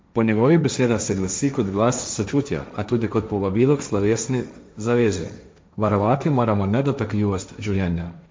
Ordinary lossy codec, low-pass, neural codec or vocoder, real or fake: none; none; codec, 16 kHz, 1.1 kbps, Voila-Tokenizer; fake